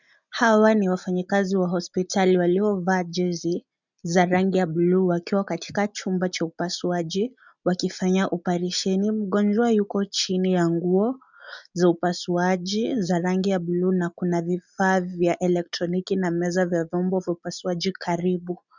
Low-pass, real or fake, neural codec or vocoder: 7.2 kHz; real; none